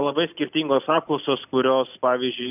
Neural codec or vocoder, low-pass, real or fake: none; 3.6 kHz; real